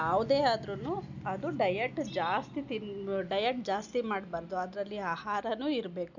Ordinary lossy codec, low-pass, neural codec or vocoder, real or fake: none; 7.2 kHz; none; real